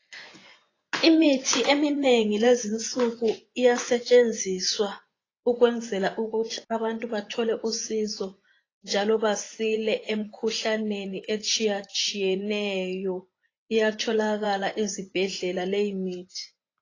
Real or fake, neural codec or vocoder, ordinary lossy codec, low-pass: fake; vocoder, 24 kHz, 100 mel bands, Vocos; AAC, 32 kbps; 7.2 kHz